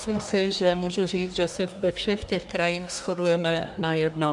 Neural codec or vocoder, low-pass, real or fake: codec, 24 kHz, 1 kbps, SNAC; 10.8 kHz; fake